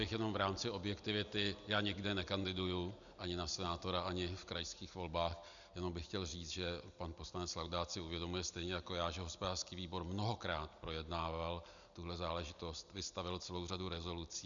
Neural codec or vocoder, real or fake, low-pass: none; real; 7.2 kHz